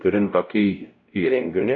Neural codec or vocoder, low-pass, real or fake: codec, 16 kHz, 0.5 kbps, X-Codec, WavLM features, trained on Multilingual LibriSpeech; 7.2 kHz; fake